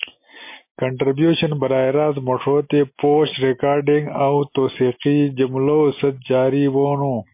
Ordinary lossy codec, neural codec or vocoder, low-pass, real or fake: MP3, 24 kbps; none; 3.6 kHz; real